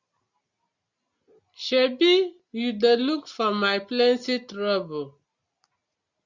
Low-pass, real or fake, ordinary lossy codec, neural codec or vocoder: 7.2 kHz; real; Opus, 64 kbps; none